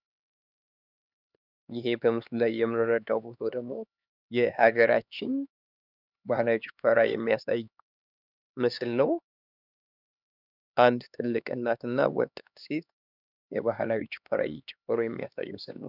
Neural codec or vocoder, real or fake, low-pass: codec, 16 kHz, 2 kbps, X-Codec, HuBERT features, trained on LibriSpeech; fake; 5.4 kHz